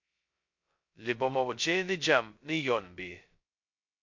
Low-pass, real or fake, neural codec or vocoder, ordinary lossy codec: 7.2 kHz; fake; codec, 16 kHz, 0.2 kbps, FocalCodec; MP3, 48 kbps